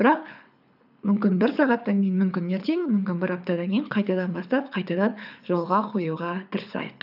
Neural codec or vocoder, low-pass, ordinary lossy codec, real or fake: codec, 24 kHz, 6 kbps, HILCodec; 5.4 kHz; none; fake